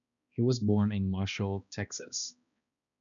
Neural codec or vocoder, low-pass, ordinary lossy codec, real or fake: codec, 16 kHz, 1 kbps, X-Codec, HuBERT features, trained on balanced general audio; 7.2 kHz; MP3, 96 kbps; fake